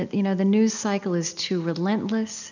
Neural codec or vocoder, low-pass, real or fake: none; 7.2 kHz; real